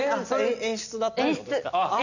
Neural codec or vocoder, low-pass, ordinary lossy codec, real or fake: none; 7.2 kHz; none; real